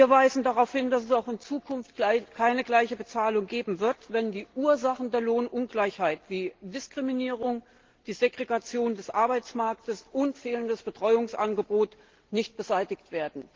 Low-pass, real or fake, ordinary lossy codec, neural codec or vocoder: 7.2 kHz; real; Opus, 16 kbps; none